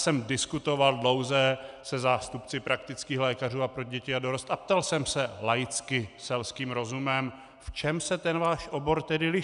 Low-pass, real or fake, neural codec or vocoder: 10.8 kHz; real; none